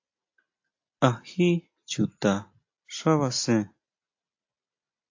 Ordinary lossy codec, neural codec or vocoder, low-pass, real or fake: AAC, 48 kbps; none; 7.2 kHz; real